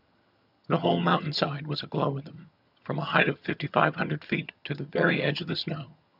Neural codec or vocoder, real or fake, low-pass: vocoder, 22.05 kHz, 80 mel bands, HiFi-GAN; fake; 5.4 kHz